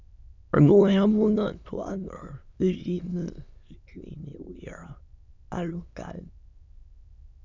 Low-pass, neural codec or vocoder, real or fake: 7.2 kHz; autoencoder, 22.05 kHz, a latent of 192 numbers a frame, VITS, trained on many speakers; fake